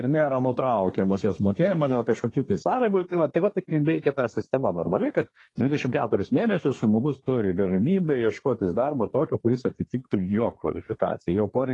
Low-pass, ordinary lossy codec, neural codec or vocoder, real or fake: 10.8 kHz; AAC, 32 kbps; codec, 24 kHz, 1 kbps, SNAC; fake